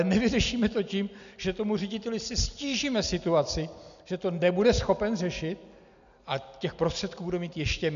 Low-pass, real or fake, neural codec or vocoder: 7.2 kHz; real; none